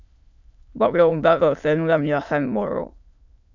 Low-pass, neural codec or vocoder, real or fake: 7.2 kHz; autoencoder, 22.05 kHz, a latent of 192 numbers a frame, VITS, trained on many speakers; fake